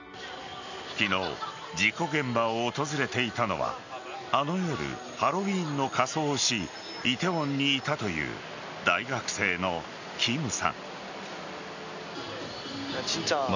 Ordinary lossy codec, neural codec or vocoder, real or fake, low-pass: none; none; real; 7.2 kHz